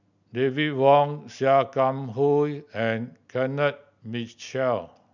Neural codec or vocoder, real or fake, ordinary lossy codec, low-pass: none; real; none; 7.2 kHz